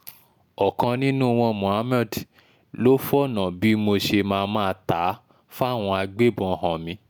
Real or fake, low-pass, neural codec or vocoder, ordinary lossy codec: real; none; none; none